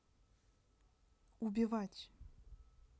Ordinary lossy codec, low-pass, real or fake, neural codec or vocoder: none; none; real; none